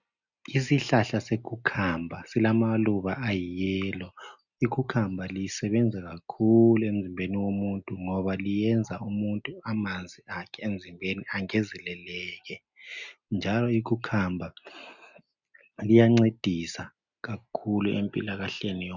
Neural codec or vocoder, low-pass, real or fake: none; 7.2 kHz; real